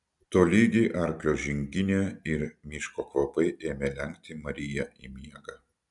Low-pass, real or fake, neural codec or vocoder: 10.8 kHz; real; none